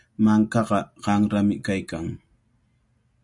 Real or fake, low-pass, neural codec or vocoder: real; 10.8 kHz; none